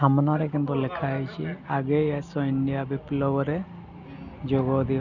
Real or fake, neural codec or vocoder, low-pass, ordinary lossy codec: real; none; 7.2 kHz; none